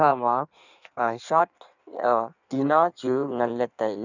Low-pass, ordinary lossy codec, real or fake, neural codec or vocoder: 7.2 kHz; none; fake; codec, 16 kHz in and 24 kHz out, 1.1 kbps, FireRedTTS-2 codec